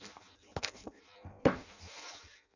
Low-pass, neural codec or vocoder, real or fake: 7.2 kHz; codec, 16 kHz in and 24 kHz out, 0.6 kbps, FireRedTTS-2 codec; fake